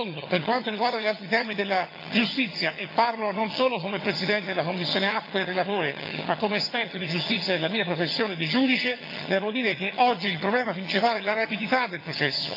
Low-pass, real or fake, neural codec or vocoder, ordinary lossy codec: 5.4 kHz; fake; vocoder, 22.05 kHz, 80 mel bands, HiFi-GAN; AAC, 32 kbps